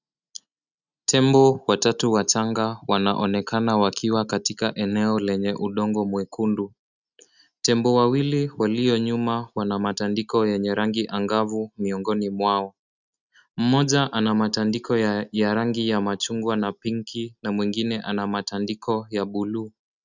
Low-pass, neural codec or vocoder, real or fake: 7.2 kHz; none; real